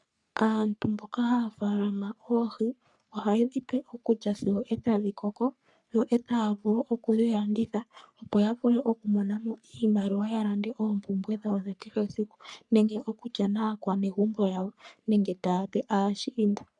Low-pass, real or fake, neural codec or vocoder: 10.8 kHz; fake; codec, 44.1 kHz, 3.4 kbps, Pupu-Codec